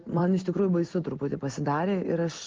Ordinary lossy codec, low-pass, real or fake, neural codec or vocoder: Opus, 16 kbps; 7.2 kHz; real; none